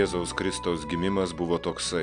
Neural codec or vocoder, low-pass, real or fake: none; 9.9 kHz; real